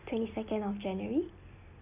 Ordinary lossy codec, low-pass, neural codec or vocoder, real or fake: none; 3.6 kHz; none; real